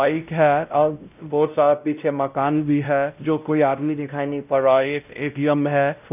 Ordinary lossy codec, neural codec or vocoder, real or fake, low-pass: none; codec, 16 kHz, 0.5 kbps, X-Codec, WavLM features, trained on Multilingual LibriSpeech; fake; 3.6 kHz